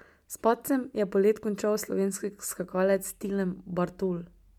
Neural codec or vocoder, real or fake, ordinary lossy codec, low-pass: none; real; MP3, 96 kbps; 19.8 kHz